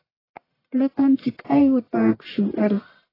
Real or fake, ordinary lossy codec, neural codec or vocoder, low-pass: fake; AAC, 24 kbps; codec, 44.1 kHz, 1.7 kbps, Pupu-Codec; 5.4 kHz